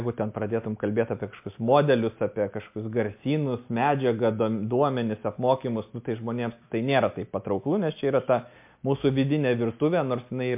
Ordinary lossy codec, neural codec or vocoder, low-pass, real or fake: MP3, 32 kbps; none; 3.6 kHz; real